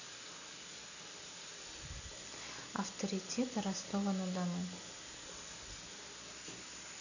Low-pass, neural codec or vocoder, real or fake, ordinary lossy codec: 7.2 kHz; none; real; none